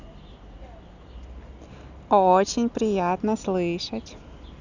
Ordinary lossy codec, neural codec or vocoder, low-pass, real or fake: none; none; 7.2 kHz; real